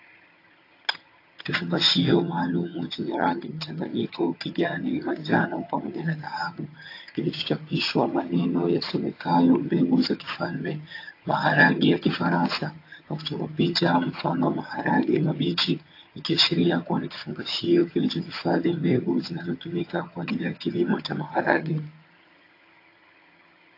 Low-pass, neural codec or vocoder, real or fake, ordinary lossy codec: 5.4 kHz; vocoder, 22.05 kHz, 80 mel bands, HiFi-GAN; fake; AAC, 32 kbps